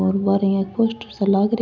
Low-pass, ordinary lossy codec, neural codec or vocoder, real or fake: 7.2 kHz; none; none; real